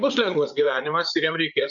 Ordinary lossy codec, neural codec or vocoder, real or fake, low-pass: MP3, 96 kbps; codec, 16 kHz, 8 kbps, FreqCodec, larger model; fake; 7.2 kHz